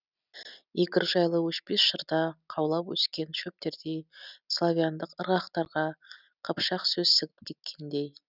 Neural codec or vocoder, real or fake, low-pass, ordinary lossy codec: none; real; 5.4 kHz; none